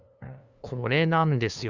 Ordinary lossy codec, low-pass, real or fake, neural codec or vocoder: Opus, 64 kbps; 7.2 kHz; fake; codec, 16 kHz, 2 kbps, FunCodec, trained on LibriTTS, 25 frames a second